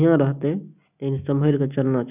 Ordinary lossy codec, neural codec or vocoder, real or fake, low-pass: none; none; real; 3.6 kHz